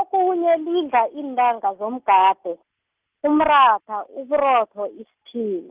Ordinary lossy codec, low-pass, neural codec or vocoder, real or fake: Opus, 24 kbps; 3.6 kHz; none; real